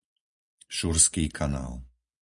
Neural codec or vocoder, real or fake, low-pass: none; real; 10.8 kHz